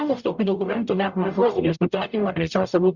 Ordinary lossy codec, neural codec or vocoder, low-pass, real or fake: Opus, 64 kbps; codec, 44.1 kHz, 0.9 kbps, DAC; 7.2 kHz; fake